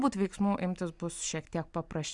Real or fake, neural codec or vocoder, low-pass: real; none; 10.8 kHz